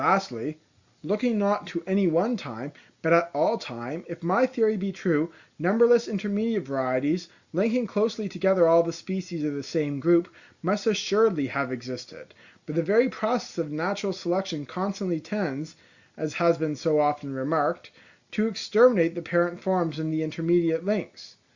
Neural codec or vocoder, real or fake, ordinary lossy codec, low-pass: none; real; Opus, 64 kbps; 7.2 kHz